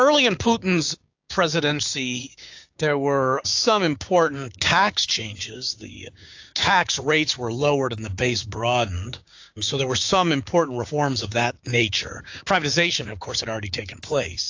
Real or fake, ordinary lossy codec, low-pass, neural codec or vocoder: fake; AAC, 48 kbps; 7.2 kHz; codec, 16 kHz, 6 kbps, DAC